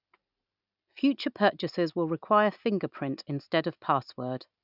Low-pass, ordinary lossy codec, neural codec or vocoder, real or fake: 5.4 kHz; none; none; real